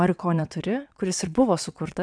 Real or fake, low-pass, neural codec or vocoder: fake; 9.9 kHz; vocoder, 22.05 kHz, 80 mel bands, WaveNeXt